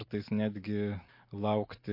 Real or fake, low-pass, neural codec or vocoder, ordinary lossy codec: real; 5.4 kHz; none; MP3, 32 kbps